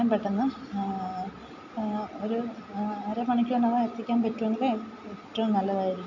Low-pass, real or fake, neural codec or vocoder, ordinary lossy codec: 7.2 kHz; real; none; MP3, 48 kbps